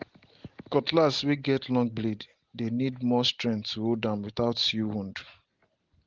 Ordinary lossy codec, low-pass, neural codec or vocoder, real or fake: Opus, 16 kbps; 7.2 kHz; none; real